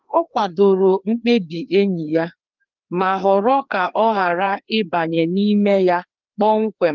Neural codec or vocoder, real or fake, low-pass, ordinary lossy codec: codec, 16 kHz, 2 kbps, FreqCodec, larger model; fake; 7.2 kHz; Opus, 24 kbps